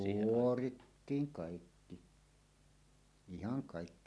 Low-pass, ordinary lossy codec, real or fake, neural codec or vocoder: none; none; real; none